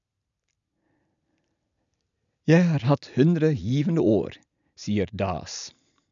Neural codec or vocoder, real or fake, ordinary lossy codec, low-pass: none; real; MP3, 96 kbps; 7.2 kHz